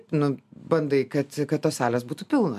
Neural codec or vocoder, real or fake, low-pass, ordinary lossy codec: none; real; 14.4 kHz; MP3, 96 kbps